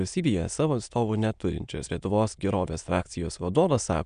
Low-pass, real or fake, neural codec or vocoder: 9.9 kHz; fake; autoencoder, 22.05 kHz, a latent of 192 numbers a frame, VITS, trained on many speakers